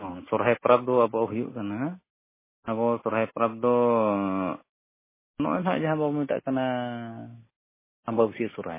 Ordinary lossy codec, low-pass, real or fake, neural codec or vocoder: MP3, 16 kbps; 3.6 kHz; real; none